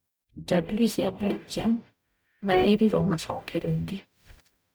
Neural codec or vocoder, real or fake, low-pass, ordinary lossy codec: codec, 44.1 kHz, 0.9 kbps, DAC; fake; none; none